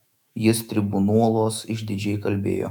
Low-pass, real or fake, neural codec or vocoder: 19.8 kHz; fake; autoencoder, 48 kHz, 128 numbers a frame, DAC-VAE, trained on Japanese speech